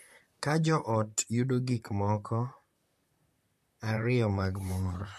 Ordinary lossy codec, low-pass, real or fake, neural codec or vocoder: MP3, 64 kbps; 14.4 kHz; fake; vocoder, 44.1 kHz, 128 mel bands, Pupu-Vocoder